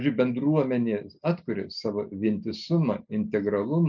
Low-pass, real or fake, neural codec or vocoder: 7.2 kHz; real; none